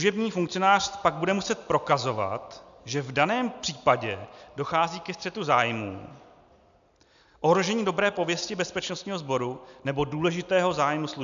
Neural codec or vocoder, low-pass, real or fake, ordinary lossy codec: none; 7.2 kHz; real; AAC, 96 kbps